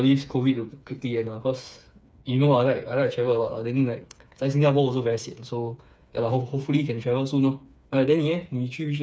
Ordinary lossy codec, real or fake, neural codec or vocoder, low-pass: none; fake; codec, 16 kHz, 4 kbps, FreqCodec, smaller model; none